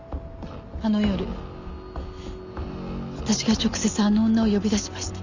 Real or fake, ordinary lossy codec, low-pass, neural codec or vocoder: real; none; 7.2 kHz; none